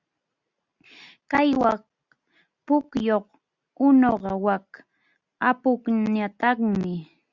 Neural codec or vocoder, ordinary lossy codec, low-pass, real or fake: none; Opus, 64 kbps; 7.2 kHz; real